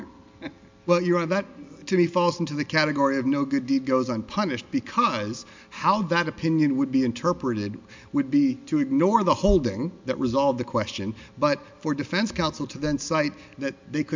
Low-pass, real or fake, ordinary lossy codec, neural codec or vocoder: 7.2 kHz; real; MP3, 64 kbps; none